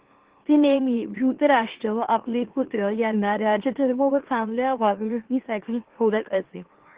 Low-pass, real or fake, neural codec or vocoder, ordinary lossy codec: 3.6 kHz; fake; autoencoder, 44.1 kHz, a latent of 192 numbers a frame, MeloTTS; Opus, 16 kbps